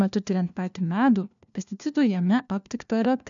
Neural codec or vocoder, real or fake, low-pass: codec, 16 kHz, 1 kbps, FunCodec, trained on LibriTTS, 50 frames a second; fake; 7.2 kHz